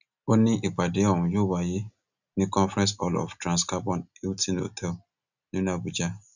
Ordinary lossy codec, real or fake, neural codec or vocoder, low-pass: none; real; none; 7.2 kHz